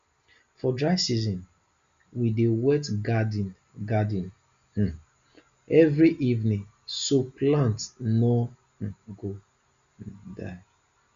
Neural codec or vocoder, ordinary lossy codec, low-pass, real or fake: none; Opus, 64 kbps; 7.2 kHz; real